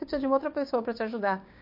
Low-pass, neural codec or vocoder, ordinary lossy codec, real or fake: 5.4 kHz; codec, 16 kHz, 6 kbps, DAC; MP3, 32 kbps; fake